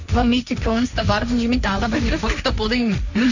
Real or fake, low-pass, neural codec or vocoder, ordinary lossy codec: fake; 7.2 kHz; codec, 16 kHz, 1.1 kbps, Voila-Tokenizer; none